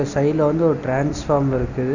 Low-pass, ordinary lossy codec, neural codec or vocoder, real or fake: 7.2 kHz; none; none; real